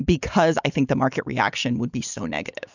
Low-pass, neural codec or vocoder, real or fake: 7.2 kHz; none; real